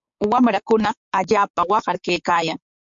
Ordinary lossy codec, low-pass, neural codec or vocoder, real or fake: MP3, 48 kbps; 7.2 kHz; codec, 16 kHz, 6 kbps, DAC; fake